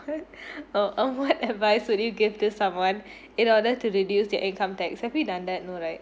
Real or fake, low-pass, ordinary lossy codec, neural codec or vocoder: real; none; none; none